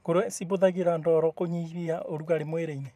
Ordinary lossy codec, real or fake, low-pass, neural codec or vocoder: none; fake; 14.4 kHz; vocoder, 44.1 kHz, 128 mel bands every 512 samples, BigVGAN v2